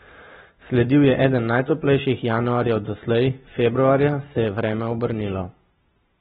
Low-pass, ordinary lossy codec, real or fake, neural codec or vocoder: 19.8 kHz; AAC, 16 kbps; real; none